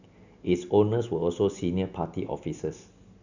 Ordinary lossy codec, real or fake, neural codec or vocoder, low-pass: none; real; none; 7.2 kHz